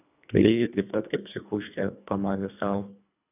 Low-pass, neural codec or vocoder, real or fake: 3.6 kHz; codec, 24 kHz, 1.5 kbps, HILCodec; fake